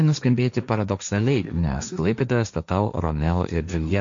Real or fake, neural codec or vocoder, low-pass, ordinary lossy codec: fake; codec, 16 kHz, 1.1 kbps, Voila-Tokenizer; 7.2 kHz; MP3, 64 kbps